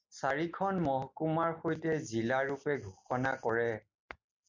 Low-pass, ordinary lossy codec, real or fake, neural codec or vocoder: 7.2 kHz; MP3, 64 kbps; real; none